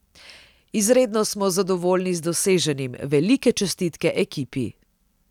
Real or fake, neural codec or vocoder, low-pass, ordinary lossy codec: real; none; 19.8 kHz; none